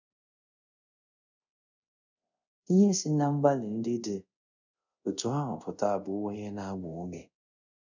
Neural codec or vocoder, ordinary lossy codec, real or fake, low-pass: codec, 24 kHz, 0.5 kbps, DualCodec; none; fake; 7.2 kHz